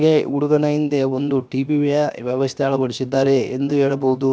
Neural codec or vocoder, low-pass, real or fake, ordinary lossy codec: codec, 16 kHz, 0.7 kbps, FocalCodec; none; fake; none